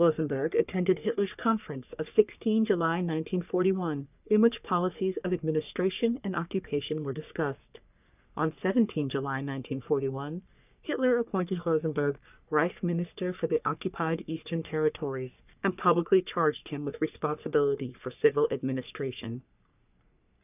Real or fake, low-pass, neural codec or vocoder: fake; 3.6 kHz; codec, 44.1 kHz, 3.4 kbps, Pupu-Codec